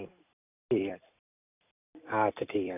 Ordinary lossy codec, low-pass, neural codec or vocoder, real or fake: none; 3.6 kHz; vocoder, 44.1 kHz, 128 mel bands every 256 samples, BigVGAN v2; fake